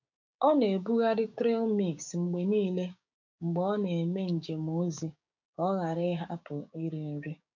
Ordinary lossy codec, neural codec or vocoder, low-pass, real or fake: none; codec, 16 kHz, 6 kbps, DAC; 7.2 kHz; fake